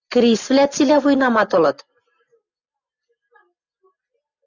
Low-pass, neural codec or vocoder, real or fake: 7.2 kHz; none; real